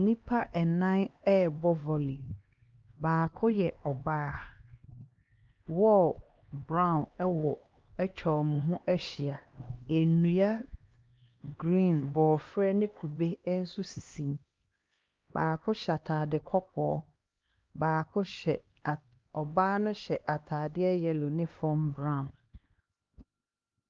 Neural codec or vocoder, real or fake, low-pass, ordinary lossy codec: codec, 16 kHz, 2 kbps, X-Codec, HuBERT features, trained on LibriSpeech; fake; 7.2 kHz; Opus, 32 kbps